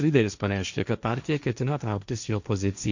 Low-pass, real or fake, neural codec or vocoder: 7.2 kHz; fake; codec, 16 kHz, 1.1 kbps, Voila-Tokenizer